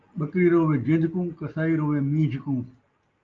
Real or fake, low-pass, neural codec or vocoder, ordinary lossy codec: real; 7.2 kHz; none; Opus, 24 kbps